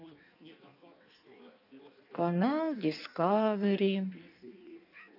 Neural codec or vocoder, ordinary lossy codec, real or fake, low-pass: codec, 16 kHz in and 24 kHz out, 1.1 kbps, FireRedTTS-2 codec; none; fake; 5.4 kHz